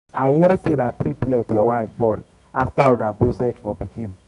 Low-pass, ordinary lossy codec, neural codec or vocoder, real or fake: 10.8 kHz; none; codec, 24 kHz, 0.9 kbps, WavTokenizer, medium music audio release; fake